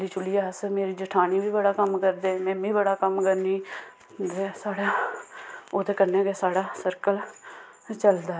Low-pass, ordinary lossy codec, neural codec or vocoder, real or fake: none; none; none; real